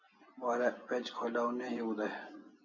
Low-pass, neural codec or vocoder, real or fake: 7.2 kHz; none; real